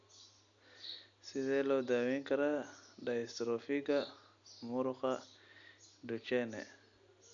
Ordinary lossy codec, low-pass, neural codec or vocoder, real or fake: none; 7.2 kHz; none; real